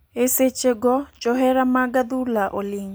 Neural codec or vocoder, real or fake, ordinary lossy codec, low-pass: none; real; none; none